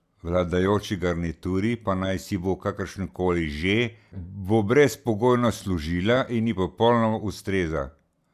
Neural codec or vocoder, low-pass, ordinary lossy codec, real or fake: none; 14.4 kHz; none; real